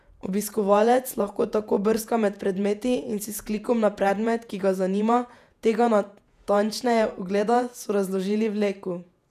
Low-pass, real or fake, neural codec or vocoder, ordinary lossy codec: 14.4 kHz; fake; vocoder, 48 kHz, 128 mel bands, Vocos; none